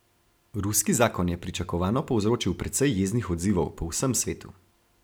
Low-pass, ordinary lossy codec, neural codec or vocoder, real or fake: none; none; none; real